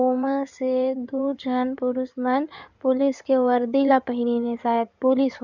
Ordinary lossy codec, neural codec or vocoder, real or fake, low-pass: none; codec, 16 kHz in and 24 kHz out, 2.2 kbps, FireRedTTS-2 codec; fake; 7.2 kHz